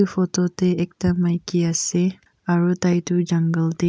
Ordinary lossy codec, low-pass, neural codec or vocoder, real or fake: none; none; none; real